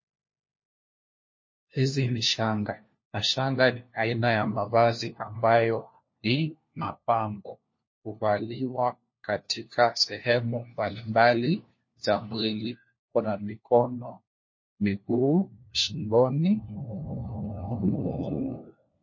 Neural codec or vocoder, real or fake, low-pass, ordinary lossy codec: codec, 16 kHz, 1 kbps, FunCodec, trained on LibriTTS, 50 frames a second; fake; 7.2 kHz; MP3, 32 kbps